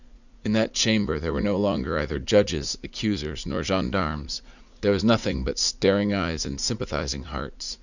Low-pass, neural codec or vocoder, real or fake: 7.2 kHz; vocoder, 44.1 kHz, 80 mel bands, Vocos; fake